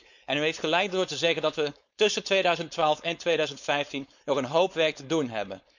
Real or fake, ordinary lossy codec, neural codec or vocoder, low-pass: fake; none; codec, 16 kHz, 4.8 kbps, FACodec; 7.2 kHz